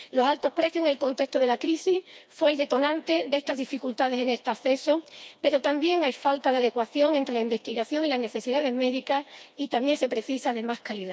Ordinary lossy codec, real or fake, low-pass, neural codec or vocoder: none; fake; none; codec, 16 kHz, 2 kbps, FreqCodec, smaller model